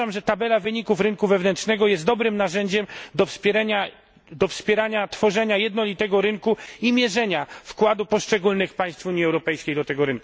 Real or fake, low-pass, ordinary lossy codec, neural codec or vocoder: real; none; none; none